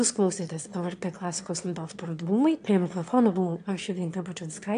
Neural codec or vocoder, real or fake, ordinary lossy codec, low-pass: autoencoder, 22.05 kHz, a latent of 192 numbers a frame, VITS, trained on one speaker; fake; AAC, 64 kbps; 9.9 kHz